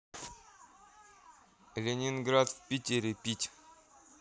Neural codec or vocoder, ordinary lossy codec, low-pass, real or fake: none; none; none; real